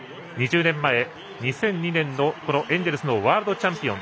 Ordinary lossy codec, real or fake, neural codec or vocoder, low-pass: none; real; none; none